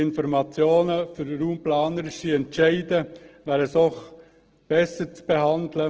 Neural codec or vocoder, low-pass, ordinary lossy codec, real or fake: none; 7.2 kHz; Opus, 16 kbps; real